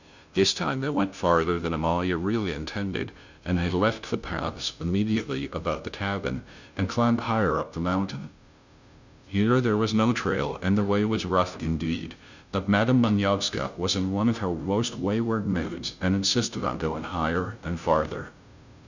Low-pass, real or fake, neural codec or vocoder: 7.2 kHz; fake; codec, 16 kHz, 0.5 kbps, FunCodec, trained on Chinese and English, 25 frames a second